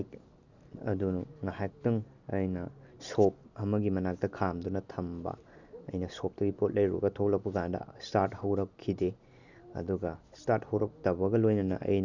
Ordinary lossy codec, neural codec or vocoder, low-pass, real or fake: none; none; 7.2 kHz; real